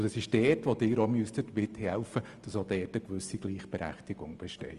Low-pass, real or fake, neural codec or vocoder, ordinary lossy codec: 10.8 kHz; real; none; Opus, 32 kbps